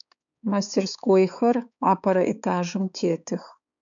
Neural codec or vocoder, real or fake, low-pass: codec, 16 kHz, 4 kbps, X-Codec, HuBERT features, trained on balanced general audio; fake; 7.2 kHz